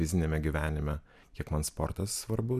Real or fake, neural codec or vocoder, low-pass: real; none; 14.4 kHz